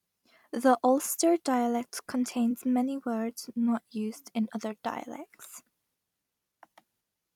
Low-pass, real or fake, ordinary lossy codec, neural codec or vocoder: 19.8 kHz; fake; none; vocoder, 44.1 kHz, 128 mel bands every 256 samples, BigVGAN v2